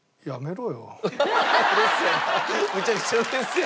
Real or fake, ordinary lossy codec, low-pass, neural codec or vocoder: real; none; none; none